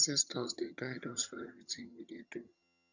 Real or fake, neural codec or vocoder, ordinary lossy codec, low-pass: fake; vocoder, 22.05 kHz, 80 mel bands, HiFi-GAN; none; 7.2 kHz